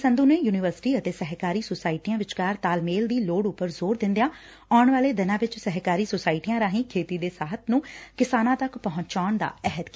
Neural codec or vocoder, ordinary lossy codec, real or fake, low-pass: none; none; real; none